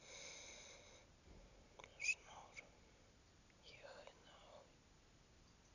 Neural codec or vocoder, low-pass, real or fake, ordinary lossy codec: none; 7.2 kHz; real; none